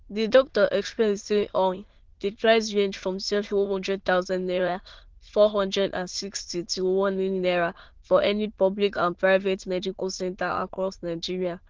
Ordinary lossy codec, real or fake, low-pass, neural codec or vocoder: Opus, 16 kbps; fake; 7.2 kHz; autoencoder, 22.05 kHz, a latent of 192 numbers a frame, VITS, trained on many speakers